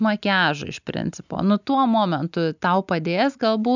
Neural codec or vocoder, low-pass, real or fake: none; 7.2 kHz; real